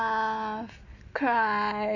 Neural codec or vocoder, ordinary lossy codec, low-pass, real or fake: none; none; 7.2 kHz; real